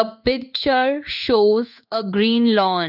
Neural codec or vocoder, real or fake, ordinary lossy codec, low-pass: codec, 16 kHz in and 24 kHz out, 1 kbps, XY-Tokenizer; fake; none; 5.4 kHz